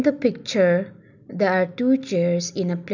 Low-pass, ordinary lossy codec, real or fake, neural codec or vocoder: 7.2 kHz; none; real; none